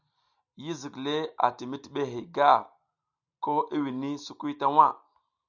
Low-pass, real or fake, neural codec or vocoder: 7.2 kHz; real; none